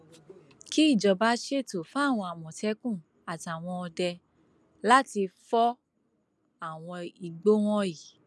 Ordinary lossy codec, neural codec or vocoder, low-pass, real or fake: none; none; none; real